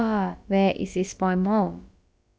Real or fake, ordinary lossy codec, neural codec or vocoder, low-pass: fake; none; codec, 16 kHz, about 1 kbps, DyCAST, with the encoder's durations; none